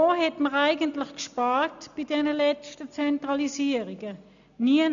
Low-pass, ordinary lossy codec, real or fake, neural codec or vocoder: 7.2 kHz; none; real; none